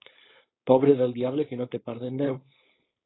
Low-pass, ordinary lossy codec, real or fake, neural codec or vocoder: 7.2 kHz; AAC, 16 kbps; fake; codec, 16 kHz, 4.8 kbps, FACodec